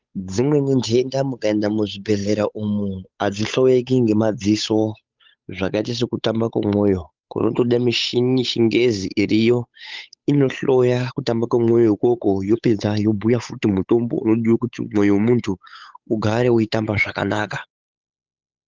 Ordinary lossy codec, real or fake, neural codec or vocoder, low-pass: Opus, 32 kbps; fake; codec, 16 kHz, 8 kbps, FunCodec, trained on Chinese and English, 25 frames a second; 7.2 kHz